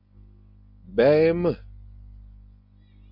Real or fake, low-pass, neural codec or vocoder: real; 5.4 kHz; none